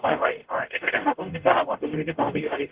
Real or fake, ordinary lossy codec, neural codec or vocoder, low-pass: fake; Opus, 16 kbps; codec, 44.1 kHz, 0.9 kbps, DAC; 3.6 kHz